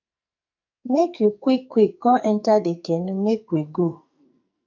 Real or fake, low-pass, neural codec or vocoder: fake; 7.2 kHz; codec, 44.1 kHz, 2.6 kbps, SNAC